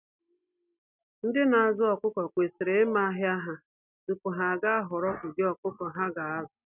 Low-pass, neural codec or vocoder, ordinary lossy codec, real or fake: 3.6 kHz; none; none; real